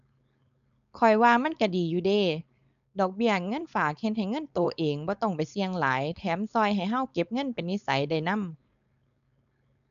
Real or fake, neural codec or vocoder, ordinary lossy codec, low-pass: fake; codec, 16 kHz, 4.8 kbps, FACodec; none; 7.2 kHz